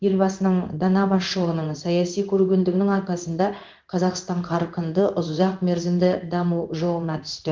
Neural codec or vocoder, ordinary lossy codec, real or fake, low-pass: codec, 16 kHz in and 24 kHz out, 1 kbps, XY-Tokenizer; Opus, 32 kbps; fake; 7.2 kHz